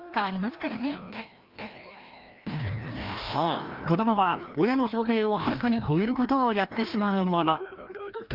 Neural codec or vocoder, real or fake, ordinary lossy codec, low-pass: codec, 16 kHz, 1 kbps, FreqCodec, larger model; fake; Opus, 24 kbps; 5.4 kHz